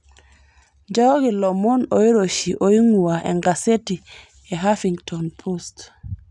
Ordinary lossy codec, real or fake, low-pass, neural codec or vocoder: none; real; 10.8 kHz; none